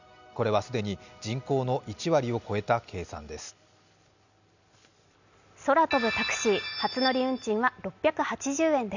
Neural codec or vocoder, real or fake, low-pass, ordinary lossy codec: none; real; 7.2 kHz; none